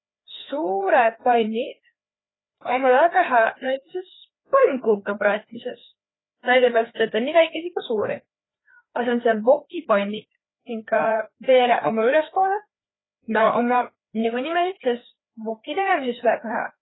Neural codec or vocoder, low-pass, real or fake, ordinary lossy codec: codec, 16 kHz, 2 kbps, FreqCodec, larger model; 7.2 kHz; fake; AAC, 16 kbps